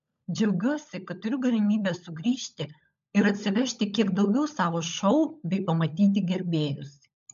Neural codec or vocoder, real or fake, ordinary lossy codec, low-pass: codec, 16 kHz, 16 kbps, FunCodec, trained on LibriTTS, 50 frames a second; fake; MP3, 64 kbps; 7.2 kHz